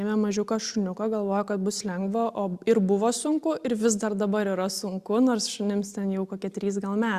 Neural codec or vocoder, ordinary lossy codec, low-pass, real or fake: none; Opus, 64 kbps; 14.4 kHz; real